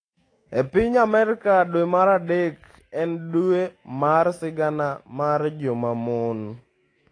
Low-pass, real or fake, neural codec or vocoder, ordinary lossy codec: 9.9 kHz; fake; autoencoder, 48 kHz, 128 numbers a frame, DAC-VAE, trained on Japanese speech; AAC, 32 kbps